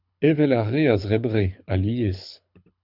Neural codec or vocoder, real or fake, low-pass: codec, 24 kHz, 6 kbps, HILCodec; fake; 5.4 kHz